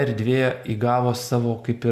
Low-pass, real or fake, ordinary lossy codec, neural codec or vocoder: 14.4 kHz; real; Opus, 64 kbps; none